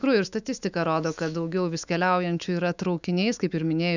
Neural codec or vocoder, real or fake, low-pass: autoencoder, 48 kHz, 128 numbers a frame, DAC-VAE, trained on Japanese speech; fake; 7.2 kHz